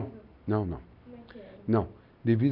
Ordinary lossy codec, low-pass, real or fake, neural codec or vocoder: none; 5.4 kHz; real; none